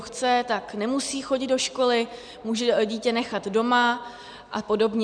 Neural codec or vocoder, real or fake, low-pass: none; real; 9.9 kHz